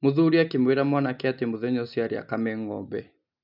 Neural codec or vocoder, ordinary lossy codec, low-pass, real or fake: none; none; 5.4 kHz; real